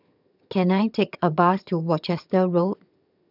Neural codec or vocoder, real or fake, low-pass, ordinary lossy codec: vocoder, 22.05 kHz, 80 mel bands, HiFi-GAN; fake; 5.4 kHz; none